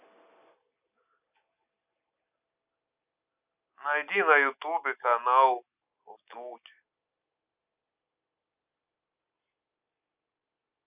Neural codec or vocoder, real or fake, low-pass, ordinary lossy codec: none; real; 3.6 kHz; none